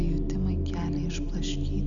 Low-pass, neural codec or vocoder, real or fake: 7.2 kHz; none; real